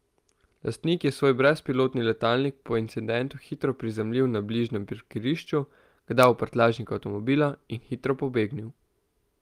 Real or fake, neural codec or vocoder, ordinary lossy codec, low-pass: real; none; Opus, 32 kbps; 14.4 kHz